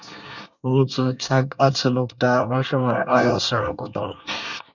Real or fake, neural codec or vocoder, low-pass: fake; codec, 24 kHz, 1 kbps, SNAC; 7.2 kHz